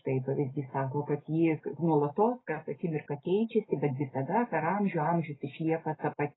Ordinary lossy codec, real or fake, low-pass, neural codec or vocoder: AAC, 16 kbps; real; 7.2 kHz; none